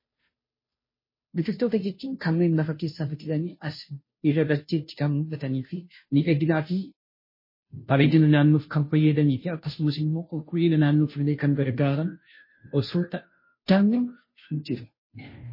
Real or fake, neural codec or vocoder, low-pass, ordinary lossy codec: fake; codec, 16 kHz, 0.5 kbps, FunCodec, trained on Chinese and English, 25 frames a second; 5.4 kHz; MP3, 24 kbps